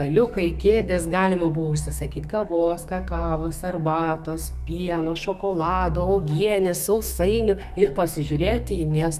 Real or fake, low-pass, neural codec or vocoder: fake; 14.4 kHz; codec, 32 kHz, 1.9 kbps, SNAC